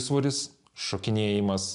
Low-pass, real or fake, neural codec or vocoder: 10.8 kHz; real; none